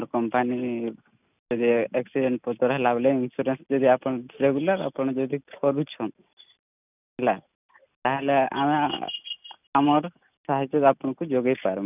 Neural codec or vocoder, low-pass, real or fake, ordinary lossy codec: none; 3.6 kHz; real; none